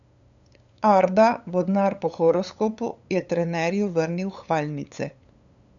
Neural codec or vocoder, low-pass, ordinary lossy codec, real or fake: codec, 16 kHz, 8 kbps, FunCodec, trained on LibriTTS, 25 frames a second; 7.2 kHz; none; fake